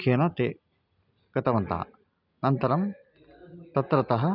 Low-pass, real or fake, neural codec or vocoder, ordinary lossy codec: 5.4 kHz; real; none; none